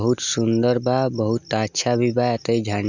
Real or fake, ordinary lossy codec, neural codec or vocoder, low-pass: real; none; none; 7.2 kHz